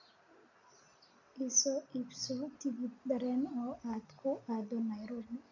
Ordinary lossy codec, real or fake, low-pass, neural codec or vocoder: none; real; 7.2 kHz; none